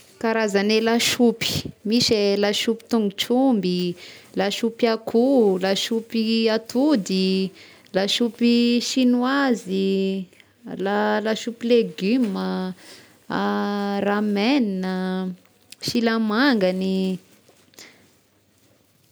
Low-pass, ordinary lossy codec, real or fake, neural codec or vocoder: none; none; real; none